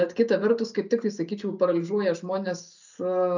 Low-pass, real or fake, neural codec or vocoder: 7.2 kHz; real; none